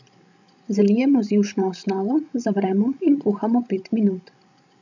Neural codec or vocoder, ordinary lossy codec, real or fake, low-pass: codec, 16 kHz, 16 kbps, FreqCodec, larger model; none; fake; 7.2 kHz